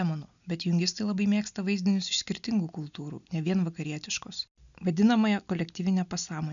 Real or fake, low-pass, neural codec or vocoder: real; 7.2 kHz; none